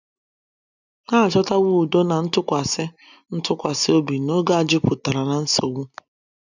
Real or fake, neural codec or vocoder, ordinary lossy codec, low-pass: real; none; none; 7.2 kHz